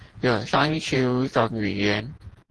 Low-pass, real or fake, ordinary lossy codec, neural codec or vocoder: 10.8 kHz; fake; Opus, 16 kbps; vocoder, 48 kHz, 128 mel bands, Vocos